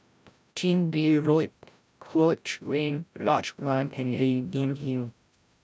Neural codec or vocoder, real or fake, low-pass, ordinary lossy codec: codec, 16 kHz, 0.5 kbps, FreqCodec, larger model; fake; none; none